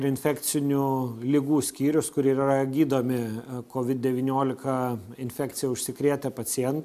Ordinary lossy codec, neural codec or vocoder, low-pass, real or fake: AAC, 96 kbps; none; 14.4 kHz; real